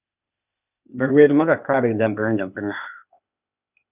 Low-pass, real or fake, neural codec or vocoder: 3.6 kHz; fake; codec, 16 kHz, 0.8 kbps, ZipCodec